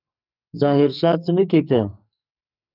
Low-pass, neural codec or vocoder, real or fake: 5.4 kHz; codec, 44.1 kHz, 2.6 kbps, SNAC; fake